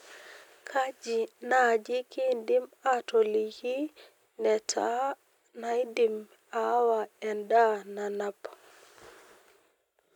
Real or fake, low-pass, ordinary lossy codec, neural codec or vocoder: real; 19.8 kHz; none; none